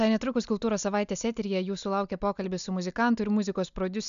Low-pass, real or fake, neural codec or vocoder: 7.2 kHz; real; none